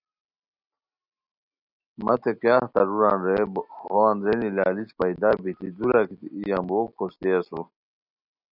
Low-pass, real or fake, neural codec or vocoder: 5.4 kHz; real; none